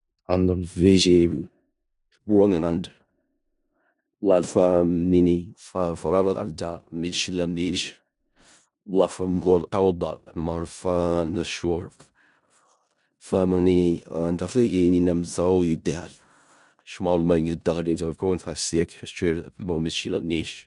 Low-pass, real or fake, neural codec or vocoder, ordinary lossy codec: 10.8 kHz; fake; codec, 16 kHz in and 24 kHz out, 0.4 kbps, LongCat-Audio-Codec, four codebook decoder; none